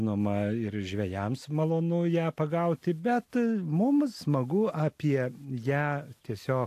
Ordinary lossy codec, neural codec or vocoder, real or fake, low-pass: AAC, 64 kbps; none; real; 14.4 kHz